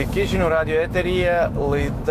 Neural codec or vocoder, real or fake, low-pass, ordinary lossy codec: autoencoder, 48 kHz, 128 numbers a frame, DAC-VAE, trained on Japanese speech; fake; 14.4 kHz; MP3, 64 kbps